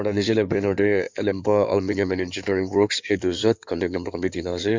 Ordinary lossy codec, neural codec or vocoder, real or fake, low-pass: MP3, 64 kbps; codec, 16 kHz in and 24 kHz out, 2.2 kbps, FireRedTTS-2 codec; fake; 7.2 kHz